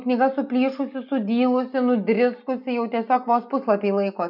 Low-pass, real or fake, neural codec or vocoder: 5.4 kHz; real; none